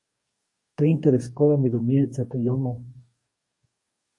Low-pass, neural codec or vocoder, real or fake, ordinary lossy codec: 10.8 kHz; codec, 44.1 kHz, 2.6 kbps, DAC; fake; MP3, 48 kbps